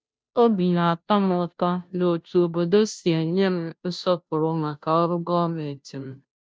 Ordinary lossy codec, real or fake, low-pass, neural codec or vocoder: none; fake; none; codec, 16 kHz, 0.5 kbps, FunCodec, trained on Chinese and English, 25 frames a second